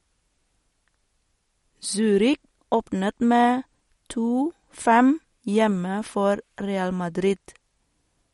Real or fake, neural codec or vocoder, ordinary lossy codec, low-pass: real; none; MP3, 48 kbps; 19.8 kHz